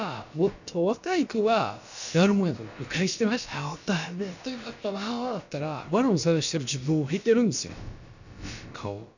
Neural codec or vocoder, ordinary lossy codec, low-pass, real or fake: codec, 16 kHz, about 1 kbps, DyCAST, with the encoder's durations; none; 7.2 kHz; fake